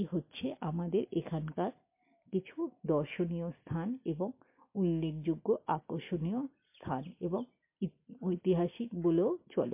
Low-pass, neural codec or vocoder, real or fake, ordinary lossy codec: 3.6 kHz; none; real; MP3, 24 kbps